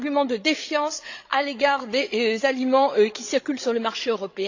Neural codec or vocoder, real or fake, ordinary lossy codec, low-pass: codec, 16 kHz, 8 kbps, FreqCodec, larger model; fake; AAC, 48 kbps; 7.2 kHz